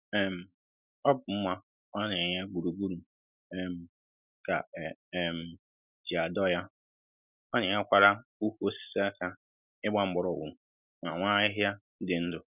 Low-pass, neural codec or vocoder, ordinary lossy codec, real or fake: 3.6 kHz; none; none; real